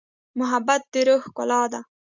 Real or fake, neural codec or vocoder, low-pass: real; none; 7.2 kHz